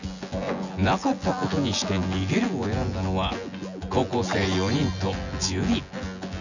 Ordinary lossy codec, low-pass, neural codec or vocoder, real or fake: none; 7.2 kHz; vocoder, 24 kHz, 100 mel bands, Vocos; fake